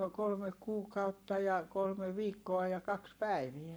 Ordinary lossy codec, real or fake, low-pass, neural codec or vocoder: none; fake; none; codec, 44.1 kHz, 7.8 kbps, Pupu-Codec